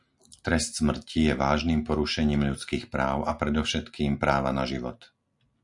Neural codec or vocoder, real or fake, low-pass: none; real; 10.8 kHz